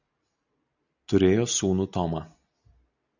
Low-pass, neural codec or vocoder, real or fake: 7.2 kHz; none; real